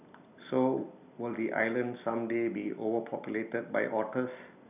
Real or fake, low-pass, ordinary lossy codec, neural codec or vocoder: real; 3.6 kHz; none; none